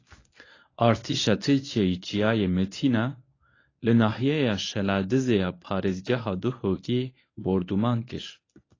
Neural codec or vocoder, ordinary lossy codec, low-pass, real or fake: codec, 24 kHz, 0.9 kbps, WavTokenizer, medium speech release version 1; AAC, 32 kbps; 7.2 kHz; fake